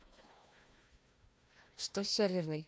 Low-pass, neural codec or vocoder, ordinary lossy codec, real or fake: none; codec, 16 kHz, 1 kbps, FunCodec, trained on Chinese and English, 50 frames a second; none; fake